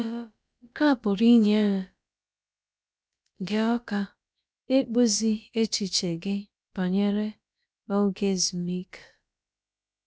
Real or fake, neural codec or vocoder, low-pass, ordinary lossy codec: fake; codec, 16 kHz, about 1 kbps, DyCAST, with the encoder's durations; none; none